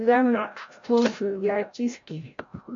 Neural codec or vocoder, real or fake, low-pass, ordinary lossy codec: codec, 16 kHz, 0.5 kbps, FreqCodec, larger model; fake; 7.2 kHz; MP3, 48 kbps